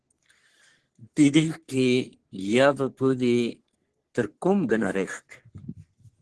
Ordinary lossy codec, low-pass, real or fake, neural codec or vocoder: Opus, 16 kbps; 10.8 kHz; fake; codec, 44.1 kHz, 3.4 kbps, Pupu-Codec